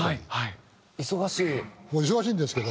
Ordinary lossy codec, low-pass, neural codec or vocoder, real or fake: none; none; none; real